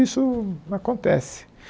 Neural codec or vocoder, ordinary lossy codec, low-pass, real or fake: none; none; none; real